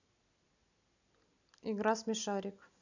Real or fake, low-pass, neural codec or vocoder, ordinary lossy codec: real; 7.2 kHz; none; none